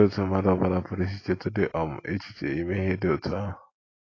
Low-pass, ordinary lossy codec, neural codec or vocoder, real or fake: 7.2 kHz; AAC, 32 kbps; none; real